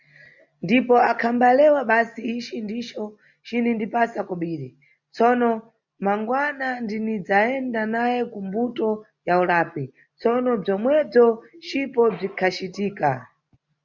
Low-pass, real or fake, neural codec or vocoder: 7.2 kHz; real; none